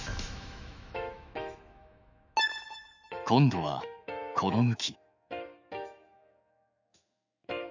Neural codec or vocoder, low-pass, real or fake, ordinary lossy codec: autoencoder, 48 kHz, 128 numbers a frame, DAC-VAE, trained on Japanese speech; 7.2 kHz; fake; none